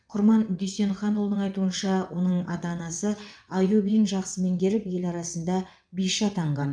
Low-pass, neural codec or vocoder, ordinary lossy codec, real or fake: 9.9 kHz; vocoder, 22.05 kHz, 80 mel bands, WaveNeXt; none; fake